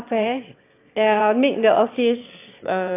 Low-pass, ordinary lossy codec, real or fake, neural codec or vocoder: 3.6 kHz; AAC, 24 kbps; fake; autoencoder, 22.05 kHz, a latent of 192 numbers a frame, VITS, trained on one speaker